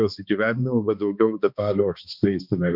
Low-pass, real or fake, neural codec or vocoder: 5.4 kHz; fake; codec, 16 kHz, 2 kbps, X-Codec, HuBERT features, trained on balanced general audio